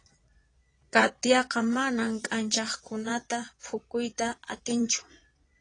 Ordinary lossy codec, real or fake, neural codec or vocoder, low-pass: AAC, 32 kbps; fake; vocoder, 22.05 kHz, 80 mel bands, Vocos; 9.9 kHz